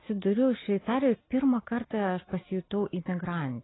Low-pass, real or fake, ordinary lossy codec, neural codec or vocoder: 7.2 kHz; real; AAC, 16 kbps; none